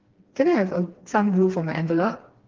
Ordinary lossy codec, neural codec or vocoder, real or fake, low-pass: Opus, 16 kbps; codec, 16 kHz, 2 kbps, FreqCodec, smaller model; fake; 7.2 kHz